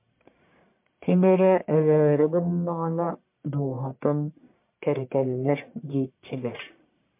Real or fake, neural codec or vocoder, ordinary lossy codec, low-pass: fake; codec, 44.1 kHz, 1.7 kbps, Pupu-Codec; MP3, 32 kbps; 3.6 kHz